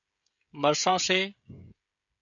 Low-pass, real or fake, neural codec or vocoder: 7.2 kHz; fake; codec, 16 kHz, 16 kbps, FreqCodec, smaller model